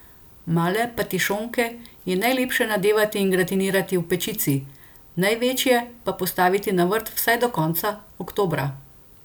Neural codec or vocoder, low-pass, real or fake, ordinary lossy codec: none; none; real; none